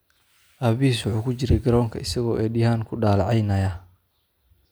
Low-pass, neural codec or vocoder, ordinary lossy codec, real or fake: none; none; none; real